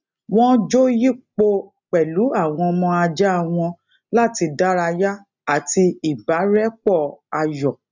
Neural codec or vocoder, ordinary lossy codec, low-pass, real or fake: none; none; 7.2 kHz; real